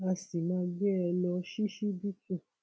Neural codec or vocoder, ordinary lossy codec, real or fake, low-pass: none; none; real; none